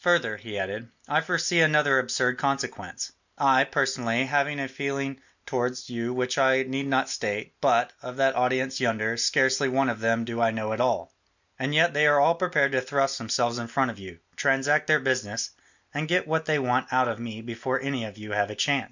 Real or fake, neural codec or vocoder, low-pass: real; none; 7.2 kHz